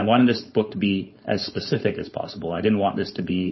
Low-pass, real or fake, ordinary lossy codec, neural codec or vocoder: 7.2 kHz; fake; MP3, 24 kbps; codec, 16 kHz, 4.8 kbps, FACodec